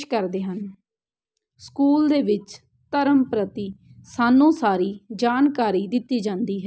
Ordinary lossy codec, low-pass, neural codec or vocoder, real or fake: none; none; none; real